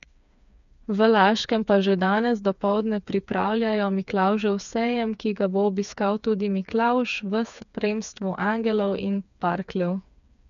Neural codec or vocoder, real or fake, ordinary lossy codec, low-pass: codec, 16 kHz, 4 kbps, FreqCodec, smaller model; fake; none; 7.2 kHz